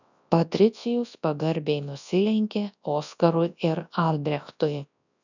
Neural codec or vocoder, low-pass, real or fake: codec, 24 kHz, 0.9 kbps, WavTokenizer, large speech release; 7.2 kHz; fake